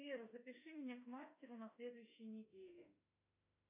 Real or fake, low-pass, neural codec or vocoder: fake; 3.6 kHz; autoencoder, 48 kHz, 32 numbers a frame, DAC-VAE, trained on Japanese speech